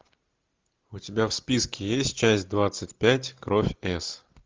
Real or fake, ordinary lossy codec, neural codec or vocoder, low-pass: real; Opus, 32 kbps; none; 7.2 kHz